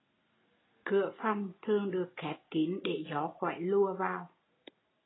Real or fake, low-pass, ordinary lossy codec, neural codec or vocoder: real; 7.2 kHz; AAC, 16 kbps; none